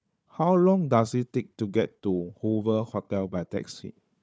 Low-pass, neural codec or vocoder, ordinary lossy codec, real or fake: none; codec, 16 kHz, 4 kbps, FunCodec, trained on Chinese and English, 50 frames a second; none; fake